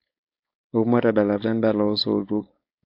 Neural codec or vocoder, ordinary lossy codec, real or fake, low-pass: codec, 16 kHz, 4.8 kbps, FACodec; AAC, 48 kbps; fake; 5.4 kHz